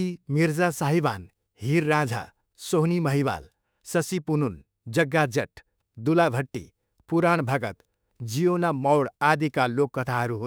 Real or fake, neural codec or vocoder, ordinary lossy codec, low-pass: fake; autoencoder, 48 kHz, 32 numbers a frame, DAC-VAE, trained on Japanese speech; none; none